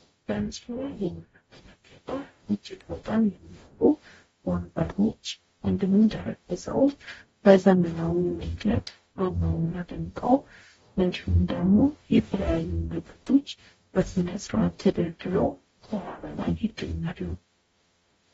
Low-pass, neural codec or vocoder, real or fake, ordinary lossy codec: 19.8 kHz; codec, 44.1 kHz, 0.9 kbps, DAC; fake; AAC, 24 kbps